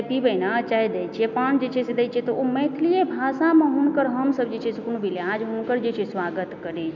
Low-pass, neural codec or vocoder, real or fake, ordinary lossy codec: 7.2 kHz; none; real; none